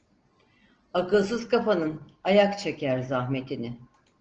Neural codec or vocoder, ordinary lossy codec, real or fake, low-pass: none; Opus, 16 kbps; real; 7.2 kHz